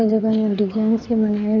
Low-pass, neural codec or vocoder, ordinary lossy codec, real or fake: 7.2 kHz; codec, 16 kHz in and 24 kHz out, 2.2 kbps, FireRedTTS-2 codec; none; fake